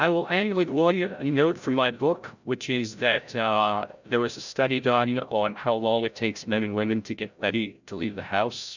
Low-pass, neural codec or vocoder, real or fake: 7.2 kHz; codec, 16 kHz, 0.5 kbps, FreqCodec, larger model; fake